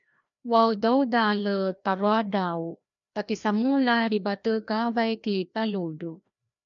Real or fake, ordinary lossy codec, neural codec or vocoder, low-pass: fake; MP3, 64 kbps; codec, 16 kHz, 1 kbps, FreqCodec, larger model; 7.2 kHz